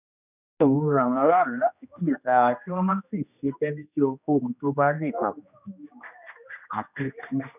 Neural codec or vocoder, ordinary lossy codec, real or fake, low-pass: codec, 16 kHz, 1 kbps, X-Codec, HuBERT features, trained on general audio; none; fake; 3.6 kHz